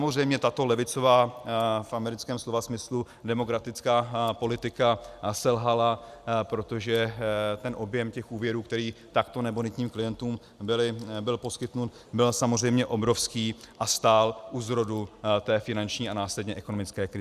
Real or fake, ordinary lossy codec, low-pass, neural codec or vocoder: real; Opus, 64 kbps; 14.4 kHz; none